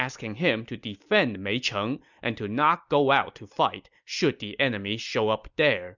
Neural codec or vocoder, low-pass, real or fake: none; 7.2 kHz; real